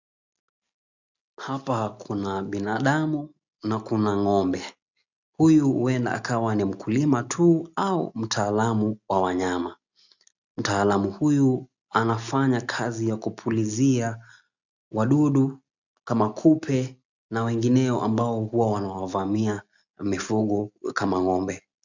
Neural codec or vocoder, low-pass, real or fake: none; 7.2 kHz; real